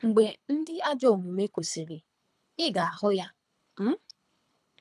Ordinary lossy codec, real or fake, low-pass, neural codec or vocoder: none; fake; none; codec, 24 kHz, 3 kbps, HILCodec